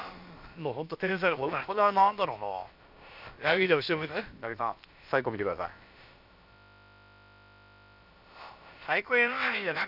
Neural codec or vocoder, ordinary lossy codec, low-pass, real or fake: codec, 16 kHz, about 1 kbps, DyCAST, with the encoder's durations; none; 5.4 kHz; fake